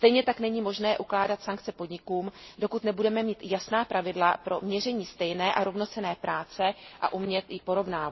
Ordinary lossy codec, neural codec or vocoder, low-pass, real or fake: MP3, 24 kbps; none; 7.2 kHz; real